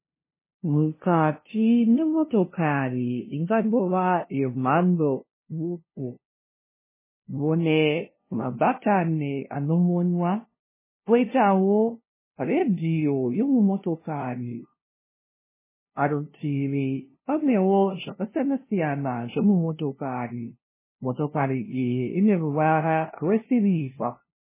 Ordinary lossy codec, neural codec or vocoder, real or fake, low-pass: MP3, 16 kbps; codec, 16 kHz, 0.5 kbps, FunCodec, trained on LibriTTS, 25 frames a second; fake; 3.6 kHz